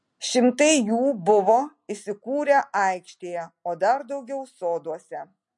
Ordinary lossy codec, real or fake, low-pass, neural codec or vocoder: MP3, 48 kbps; real; 10.8 kHz; none